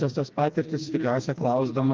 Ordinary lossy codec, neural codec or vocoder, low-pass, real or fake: Opus, 24 kbps; codec, 16 kHz, 2 kbps, FreqCodec, smaller model; 7.2 kHz; fake